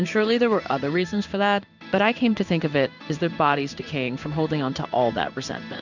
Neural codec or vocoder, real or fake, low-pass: codec, 16 kHz in and 24 kHz out, 1 kbps, XY-Tokenizer; fake; 7.2 kHz